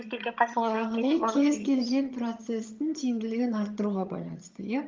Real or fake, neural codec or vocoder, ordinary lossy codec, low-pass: fake; vocoder, 22.05 kHz, 80 mel bands, HiFi-GAN; Opus, 24 kbps; 7.2 kHz